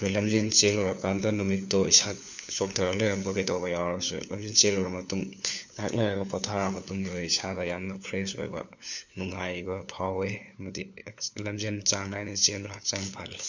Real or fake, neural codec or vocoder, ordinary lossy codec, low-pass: fake; codec, 16 kHz, 4 kbps, FunCodec, trained on LibriTTS, 50 frames a second; none; 7.2 kHz